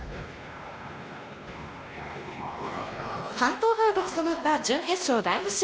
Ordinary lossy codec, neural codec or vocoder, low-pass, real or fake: none; codec, 16 kHz, 1 kbps, X-Codec, WavLM features, trained on Multilingual LibriSpeech; none; fake